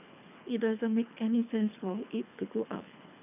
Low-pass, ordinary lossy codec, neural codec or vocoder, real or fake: 3.6 kHz; none; codec, 24 kHz, 3 kbps, HILCodec; fake